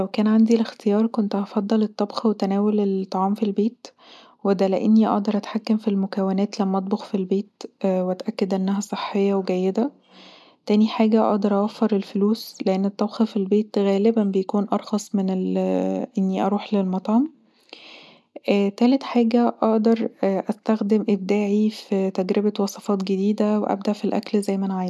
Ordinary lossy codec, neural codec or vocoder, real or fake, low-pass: none; none; real; none